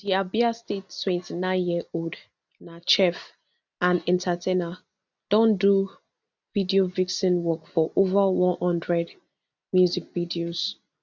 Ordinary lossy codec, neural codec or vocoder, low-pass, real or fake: none; none; 7.2 kHz; real